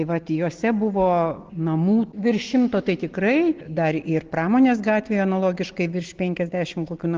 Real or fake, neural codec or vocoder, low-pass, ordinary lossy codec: real; none; 7.2 kHz; Opus, 16 kbps